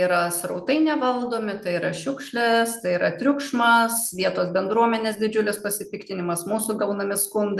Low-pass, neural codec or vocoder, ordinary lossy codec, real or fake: 14.4 kHz; none; Opus, 32 kbps; real